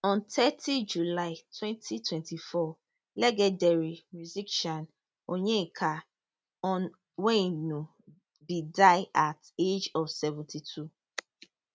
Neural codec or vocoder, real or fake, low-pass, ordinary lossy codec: none; real; none; none